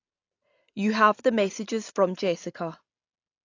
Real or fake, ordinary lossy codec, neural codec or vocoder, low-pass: real; AAC, 48 kbps; none; 7.2 kHz